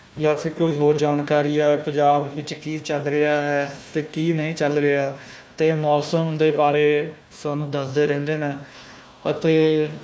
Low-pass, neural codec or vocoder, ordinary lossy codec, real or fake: none; codec, 16 kHz, 1 kbps, FunCodec, trained on Chinese and English, 50 frames a second; none; fake